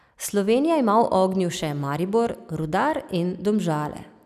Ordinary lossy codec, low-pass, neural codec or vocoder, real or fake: none; 14.4 kHz; none; real